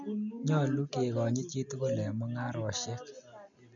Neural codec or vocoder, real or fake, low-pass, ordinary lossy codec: none; real; 7.2 kHz; none